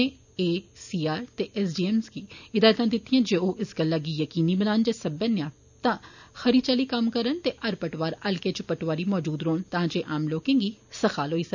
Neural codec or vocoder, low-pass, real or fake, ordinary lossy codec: none; 7.2 kHz; real; none